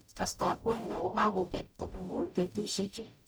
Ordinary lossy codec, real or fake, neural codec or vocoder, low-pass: none; fake; codec, 44.1 kHz, 0.9 kbps, DAC; none